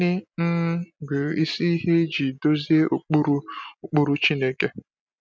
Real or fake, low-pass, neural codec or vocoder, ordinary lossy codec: real; none; none; none